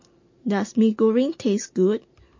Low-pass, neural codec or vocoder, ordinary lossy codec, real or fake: 7.2 kHz; none; MP3, 32 kbps; real